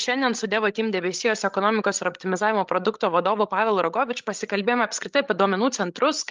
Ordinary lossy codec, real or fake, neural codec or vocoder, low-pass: Opus, 24 kbps; fake; codec, 16 kHz, 16 kbps, FreqCodec, larger model; 7.2 kHz